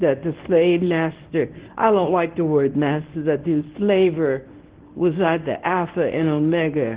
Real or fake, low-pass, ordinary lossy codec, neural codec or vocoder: fake; 3.6 kHz; Opus, 16 kbps; codec, 24 kHz, 0.9 kbps, WavTokenizer, medium speech release version 1